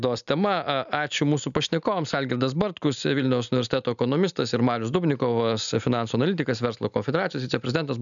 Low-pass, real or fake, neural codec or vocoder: 7.2 kHz; real; none